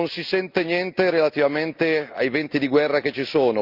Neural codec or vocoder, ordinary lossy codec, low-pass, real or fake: none; Opus, 24 kbps; 5.4 kHz; real